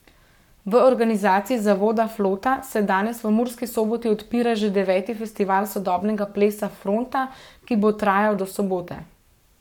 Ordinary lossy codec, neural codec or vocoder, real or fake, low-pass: none; codec, 44.1 kHz, 7.8 kbps, Pupu-Codec; fake; 19.8 kHz